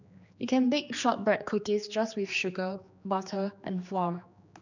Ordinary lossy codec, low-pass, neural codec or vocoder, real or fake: none; 7.2 kHz; codec, 16 kHz, 2 kbps, X-Codec, HuBERT features, trained on general audio; fake